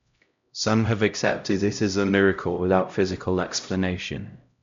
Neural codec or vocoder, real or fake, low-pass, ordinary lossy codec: codec, 16 kHz, 0.5 kbps, X-Codec, HuBERT features, trained on LibriSpeech; fake; 7.2 kHz; MP3, 64 kbps